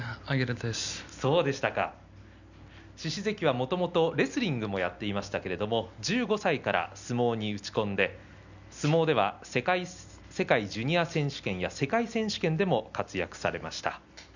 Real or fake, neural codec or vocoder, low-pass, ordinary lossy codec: real; none; 7.2 kHz; none